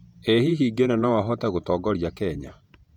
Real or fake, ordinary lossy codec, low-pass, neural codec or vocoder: fake; none; 19.8 kHz; vocoder, 48 kHz, 128 mel bands, Vocos